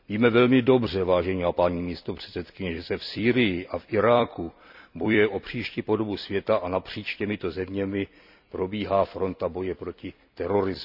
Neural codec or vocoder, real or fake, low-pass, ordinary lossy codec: vocoder, 44.1 kHz, 128 mel bands every 256 samples, BigVGAN v2; fake; 5.4 kHz; none